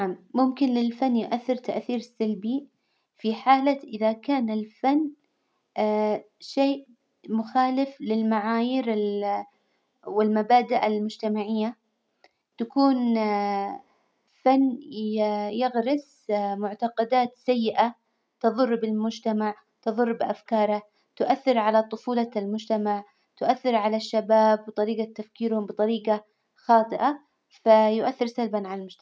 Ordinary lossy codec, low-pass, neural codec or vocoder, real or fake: none; none; none; real